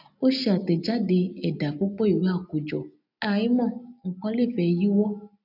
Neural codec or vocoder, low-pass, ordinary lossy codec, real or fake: none; 5.4 kHz; none; real